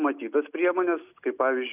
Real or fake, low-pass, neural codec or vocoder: real; 3.6 kHz; none